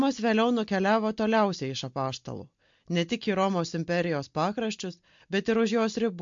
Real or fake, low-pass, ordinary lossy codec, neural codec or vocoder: real; 7.2 kHz; MP3, 48 kbps; none